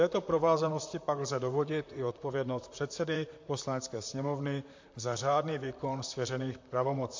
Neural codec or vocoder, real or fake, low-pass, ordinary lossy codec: vocoder, 44.1 kHz, 128 mel bands, Pupu-Vocoder; fake; 7.2 kHz; MP3, 48 kbps